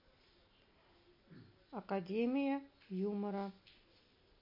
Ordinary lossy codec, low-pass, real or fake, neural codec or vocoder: MP3, 32 kbps; 5.4 kHz; real; none